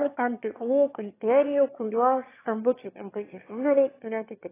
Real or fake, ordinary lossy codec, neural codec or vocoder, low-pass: fake; AAC, 16 kbps; autoencoder, 22.05 kHz, a latent of 192 numbers a frame, VITS, trained on one speaker; 3.6 kHz